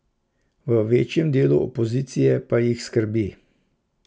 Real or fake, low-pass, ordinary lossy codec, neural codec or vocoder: real; none; none; none